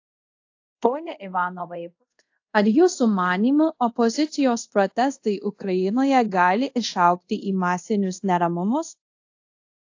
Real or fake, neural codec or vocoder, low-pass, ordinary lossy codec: fake; codec, 24 kHz, 0.5 kbps, DualCodec; 7.2 kHz; AAC, 48 kbps